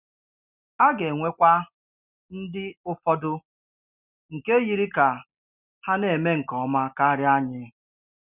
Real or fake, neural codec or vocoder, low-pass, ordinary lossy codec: real; none; 3.6 kHz; Opus, 64 kbps